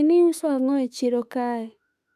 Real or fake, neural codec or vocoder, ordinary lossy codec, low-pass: fake; autoencoder, 48 kHz, 32 numbers a frame, DAC-VAE, trained on Japanese speech; none; 14.4 kHz